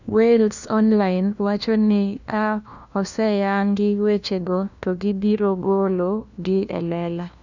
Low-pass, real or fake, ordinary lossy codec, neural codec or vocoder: 7.2 kHz; fake; none; codec, 16 kHz, 1 kbps, FunCodec, trained on LibriTTS, 50 frames a second